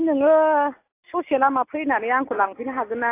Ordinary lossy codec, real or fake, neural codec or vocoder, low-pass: AAC, 24 kbps; real; none; 3.6 kHz